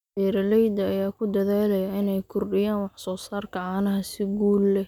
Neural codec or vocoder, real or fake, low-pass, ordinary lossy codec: none; real; 19.8 kHz; none